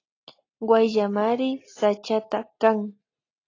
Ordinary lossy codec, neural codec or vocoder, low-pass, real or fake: AAC, 32 kbps; none; 7.2 kHz; real